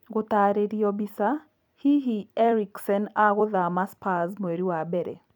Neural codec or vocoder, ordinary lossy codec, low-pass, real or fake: vocoder, 44.1 kHz, 128 mel bands every 256 samples, BigVGAN v2; none; 19.8 kHz; fake